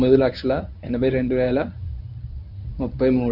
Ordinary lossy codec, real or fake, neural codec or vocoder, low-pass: none; fake; codec, 24 kHz, 0.9 kbps, WavTokenizer, medium speech release version 1; 5.4 kHz